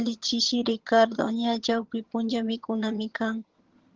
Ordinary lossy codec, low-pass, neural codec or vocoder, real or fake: Opus, 16 kbps; 7.2 kHz; vocoder, 22.05 kHz, 80 mel bands, HiFi-GAN; fake